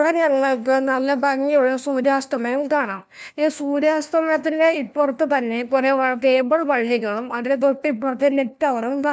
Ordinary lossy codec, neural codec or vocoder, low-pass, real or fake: none; codec, 16 kHz, 1 kbps, FunCodec, trained on LibriTTS, 50 frames a second; none; fake